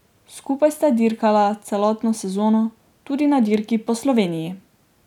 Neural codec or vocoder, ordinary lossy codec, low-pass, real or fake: none; none; 19.8 kHz; real